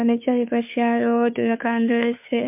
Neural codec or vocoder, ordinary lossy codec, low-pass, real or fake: codec, 16 kHz, 2 kbps, FunCodec, trained on Chinese and English, 25 frames a second; MP3, 32 kbps; 3.6 kHz; fake